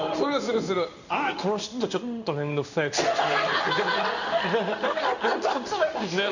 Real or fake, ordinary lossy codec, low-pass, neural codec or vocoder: fake; none; 7.2 kHz; codec, 16 kHz in and 24 kHz out, 1 kbps, XY-Tokenizer